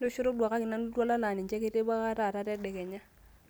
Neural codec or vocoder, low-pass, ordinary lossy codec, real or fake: none; none; none; real